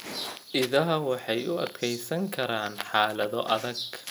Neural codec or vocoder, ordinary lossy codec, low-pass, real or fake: none; none; none; real